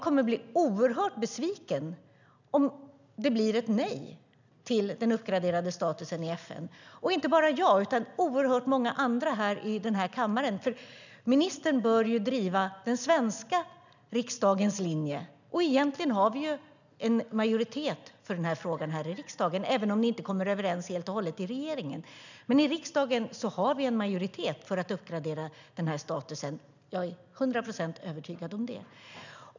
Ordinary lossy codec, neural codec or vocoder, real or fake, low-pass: none; none; real; 7.2 kHz